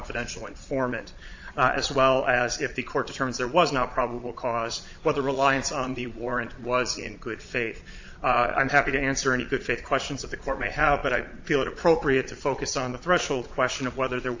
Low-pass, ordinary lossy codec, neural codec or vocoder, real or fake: 7.2 kHz; AAC, 48 kbps; vocoder, 22.05 kHz, 80 mel bands, Vocos; fake